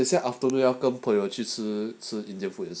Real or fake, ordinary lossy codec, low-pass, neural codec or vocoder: real; none; none; none